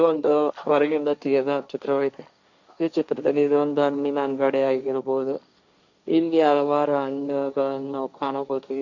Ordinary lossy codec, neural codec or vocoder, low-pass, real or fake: none; codec, 16 kHz, 1.1 kbps, Voila-Tokenizer; 7.2 kHz; fake